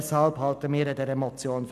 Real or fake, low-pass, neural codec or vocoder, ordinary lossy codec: real; 14.4 kHz; none; none